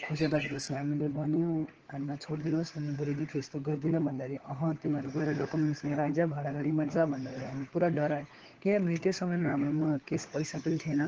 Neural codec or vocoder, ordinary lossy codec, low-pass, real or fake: codec, 16 kHz, 4 kbps, FunCodec, trained on LibriTTS, 50 frames a second; Opus, 16 kbps; 7.2 kHz; fake